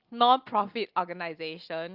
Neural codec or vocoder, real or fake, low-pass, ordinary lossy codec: none; real; 5.4 kHz; Opus, 24 kbps